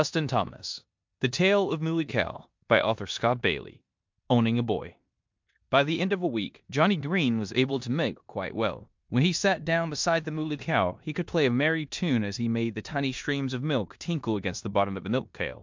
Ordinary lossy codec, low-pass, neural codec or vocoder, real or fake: MP3, 64 kbps; 7.2 kHz; codec, 16 kHz in and 24 kHz out, 0.9 kbps, LongCat-Audio-Codec, four codebook decoder; fake